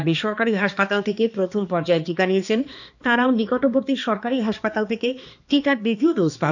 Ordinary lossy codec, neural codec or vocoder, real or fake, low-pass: none; codec, 16 kHz, 2 kbps, X-Codec, HuBERT features, trained on balanced general audio; fake; 7.2 kHz